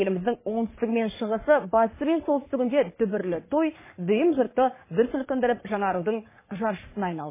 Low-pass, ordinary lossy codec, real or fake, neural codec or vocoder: 3.6 kHz; MP3, 16 kbps; fake; codec, 44.1 kHz, 3.4 kbps, Pupu-Codec